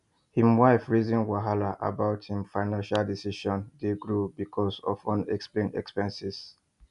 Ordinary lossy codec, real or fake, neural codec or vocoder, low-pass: none; real; none; 10.8 kHz